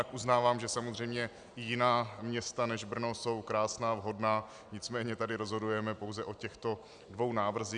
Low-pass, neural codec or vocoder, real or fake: 9.9 kHz; none; real